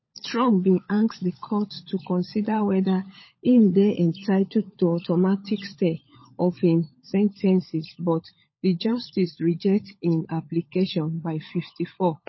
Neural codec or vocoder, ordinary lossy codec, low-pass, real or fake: codec, 16 kHz, 16 kbps, FunCodec, trained on LibriTTS, 50 frames a second; MP3, 24 kbps; 7.2 kHz; fake